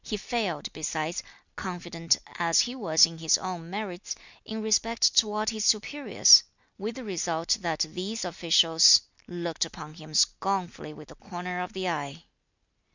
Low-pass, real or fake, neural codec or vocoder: 7.2 kHz; real; none